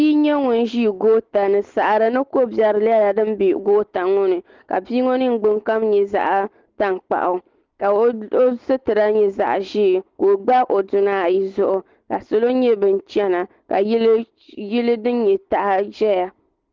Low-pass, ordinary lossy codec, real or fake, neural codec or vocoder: 7.2 kHz; Opus, 16 kbps; real; none